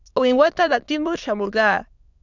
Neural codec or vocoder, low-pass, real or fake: autoencoder, 22.05 kHz, a latent of 192 numbers a frame, VITS, trained on many speakers; 7.2 kHz; fake